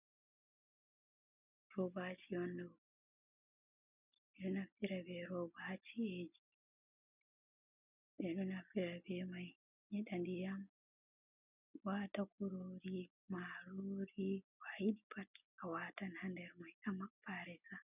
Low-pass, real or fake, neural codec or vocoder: 3.6 kHz; real; none